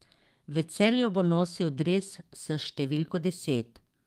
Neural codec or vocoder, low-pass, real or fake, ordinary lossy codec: codec, 32 kHz, 1.9 kbps, SNAC; 14.4 kHz; fake; Opus, 32 kbps